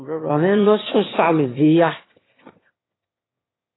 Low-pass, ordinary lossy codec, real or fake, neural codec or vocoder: 7.2 kHz; AAC, 16 kbps; fake; autoencoder, 22.05 kHz, a latent of 192 numbers a frame, VITS, trained on one speaker